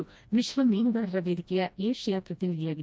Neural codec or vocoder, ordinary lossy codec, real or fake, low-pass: codec, 16 kHz, 1 kbps, FreqCodec, smaller model; none; fake; none